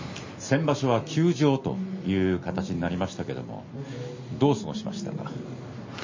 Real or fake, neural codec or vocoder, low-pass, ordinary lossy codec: real; none; 7.2 kHz; MP3, 32 kbps